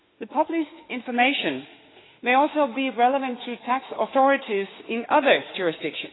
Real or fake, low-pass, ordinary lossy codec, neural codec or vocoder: fake; 7.2 kHz; AAC, 16 kbps; autoencoder, 48 kHz, 32 numbers a frame, DAC-VAE, trained on Japanese speech